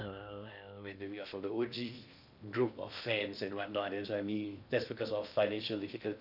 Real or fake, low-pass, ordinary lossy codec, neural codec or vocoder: fake; 5.4 kHz; none; codec, 16 kHz in and 24 kHz out, 0.6 kbps, FocalCodec, streaming, 2048 codes